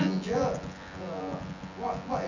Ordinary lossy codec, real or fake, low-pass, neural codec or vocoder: none; fake; 7.2 kHz; vocoder, 24 kHz, 100 mel bands, Vocos